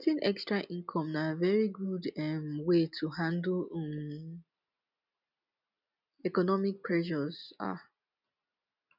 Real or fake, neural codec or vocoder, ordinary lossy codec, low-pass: real; none; none; 5.4 kHz